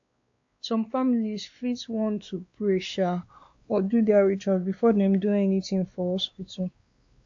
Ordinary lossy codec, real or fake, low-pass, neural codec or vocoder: AAC, 48 kbps; fake; 7.2 kHz; codec, 16 kHz, 2 kbps, X-Codec, WavLM features, trained on Multilingual LibriSpeech